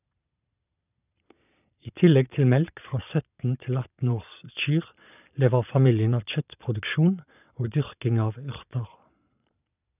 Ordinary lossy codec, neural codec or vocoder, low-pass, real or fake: none; vocoder, 22.05 kHz, 80 mel bands, Vocos; 3.6 kHz; fake